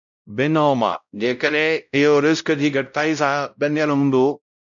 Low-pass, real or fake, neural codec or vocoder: 7.2 kHz; fake; codec, 16 kHz, 0.5 kbps, X-Codec, WavLM features, trained on Multilingual LibriSpeech